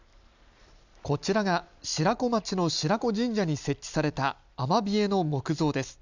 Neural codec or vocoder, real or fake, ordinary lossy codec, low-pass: none; real; none; 7.2 kHz